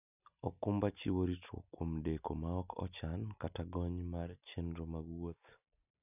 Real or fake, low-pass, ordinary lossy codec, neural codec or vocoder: real; 3.6 kHz; none; none